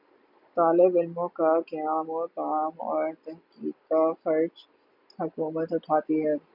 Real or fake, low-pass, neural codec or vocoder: real; 5.4 kHz; none